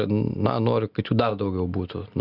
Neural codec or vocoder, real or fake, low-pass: none; real; 5.4 kHz